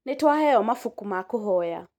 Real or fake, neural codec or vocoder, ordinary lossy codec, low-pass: real; none; MP3, 96 kbps; 19.8 kHz